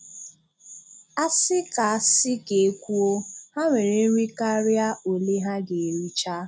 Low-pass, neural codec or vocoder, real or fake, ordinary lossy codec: none; none; real; none